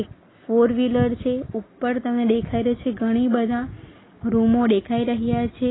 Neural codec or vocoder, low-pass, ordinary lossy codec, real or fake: none; 7.2 kHz; AAC, 16 kbps; real